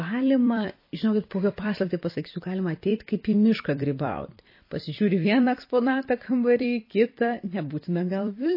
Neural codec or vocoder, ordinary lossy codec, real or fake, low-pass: vocoder, 44.1 kHz, 128 mel bands every 256 samples, BigVGAN v2; MP3, 24 kbps; fake; 5.4 kHz